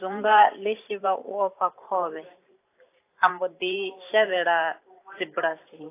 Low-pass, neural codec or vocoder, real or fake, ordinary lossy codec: 3.6 kHz; vocoder, 44.1 kHz, 128 mel bands every 512 samples, BigVGAN v2; fake; AAC, 24 kbps